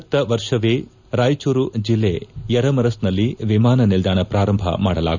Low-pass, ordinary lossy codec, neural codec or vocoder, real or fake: 7.2 kHz; none; none; real